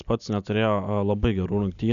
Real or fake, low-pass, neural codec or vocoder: real; 7.2 kHz; none